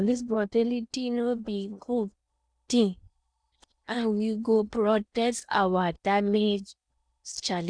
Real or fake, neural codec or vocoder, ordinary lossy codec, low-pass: fake; codec, 16 kHz in and 24 kHz out, 0.8 kbps, FocalCodec, streaming, 65536 codes; none; 9.9 kHz